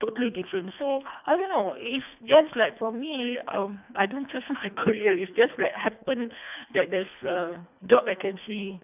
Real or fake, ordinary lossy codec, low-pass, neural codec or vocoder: fake; none; 3.6 kHz; codec, 24 kHz, 1.5 kbps, HILCodec